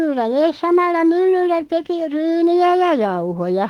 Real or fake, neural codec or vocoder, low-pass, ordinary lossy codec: fake; autoencoder, 48 kHz, 32 numbers a frame, DAC-VAE, trained on Japanese speech; 19.8 kHz; Opus, 24 kbps